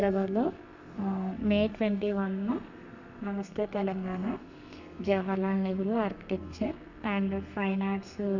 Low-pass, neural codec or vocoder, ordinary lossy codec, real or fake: 7.2 kHz; codec, 32 kHz, 1.9 kbps, SNAC; none; fake